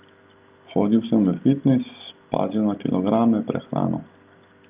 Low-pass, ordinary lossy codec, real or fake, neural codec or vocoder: 3.6 kHz; Opus, 24 kbps; real; none